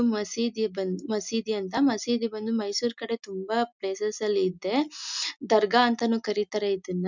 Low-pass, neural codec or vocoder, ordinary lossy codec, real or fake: 7.2 kHz; none; none; real